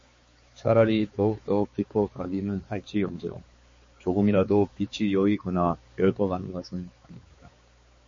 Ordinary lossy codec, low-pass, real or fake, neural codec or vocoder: MP3, 32 kbps; 7.2 kHz; fake; codec, 16 kHz, 4 kbps, X-Codec, HuBERT features, trained on balanced general audio